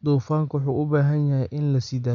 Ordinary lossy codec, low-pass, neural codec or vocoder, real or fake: none; 7.2 kHz; none; real